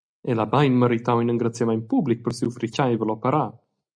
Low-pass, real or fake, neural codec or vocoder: 9.9 kHz; real; none